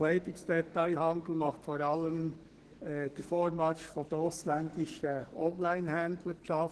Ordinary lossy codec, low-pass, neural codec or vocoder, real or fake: Opus, 16 kbps; 10.8 kHz; codec, 44.1 kHz, 2.6 kbps, SNAC; fake